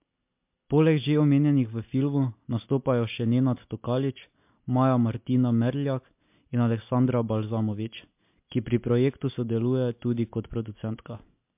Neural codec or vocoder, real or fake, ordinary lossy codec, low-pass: none; real; MP3, 32 kbps; 3.6 kHz